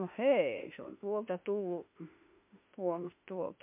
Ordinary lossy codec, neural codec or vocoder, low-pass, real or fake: MP3, 32 kbps; autoencoder, 48 kHz, 32 numbers a frame, DAC-VAE, trained on Japanese speech; 3.6 kHz; fake